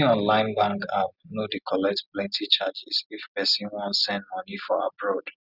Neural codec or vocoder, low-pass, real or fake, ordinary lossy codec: none; 5.4 kHz; real; none